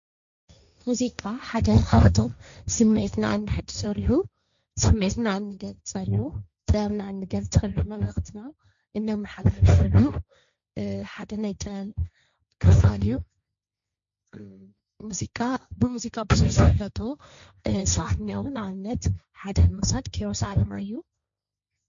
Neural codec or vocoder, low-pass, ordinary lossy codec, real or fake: codec, 16 kHz, 1.1 kbps, Voila-Tokenizer; 7.2 kHz; MP3, 64 kbps; fake